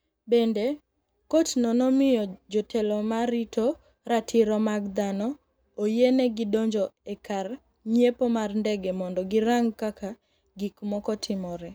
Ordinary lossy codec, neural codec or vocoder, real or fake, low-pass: none; none; real; none